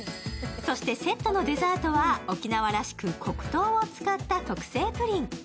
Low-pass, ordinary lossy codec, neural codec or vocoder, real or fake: none; none; none; real